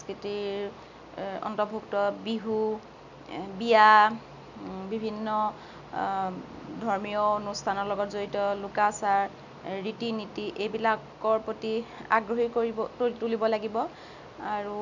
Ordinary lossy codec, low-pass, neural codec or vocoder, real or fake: none; 7.2 kHz; none; real